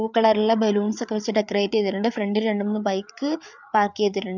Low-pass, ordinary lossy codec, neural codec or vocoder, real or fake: 7.2 kHz; none; codec, 16 kHz, 8 kbps, FreqCodec, larger model; fake